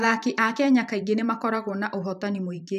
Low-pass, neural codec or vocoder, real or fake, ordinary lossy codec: 14.4 kHz; vocoder, 44.1 kHz, 128 mel bands every 512 samples, BigVGAN v2; fake; none